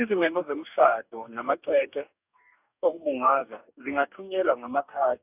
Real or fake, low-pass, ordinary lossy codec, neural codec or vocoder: fake; 3.6 kHz; none; codec, 44.1 kHz, 2.6 kbps, DAC